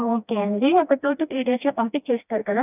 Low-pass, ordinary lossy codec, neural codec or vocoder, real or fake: 3.6 kHz; none; codec, 16 kHz, 1 kbps, FreqCodec, smaller model; fake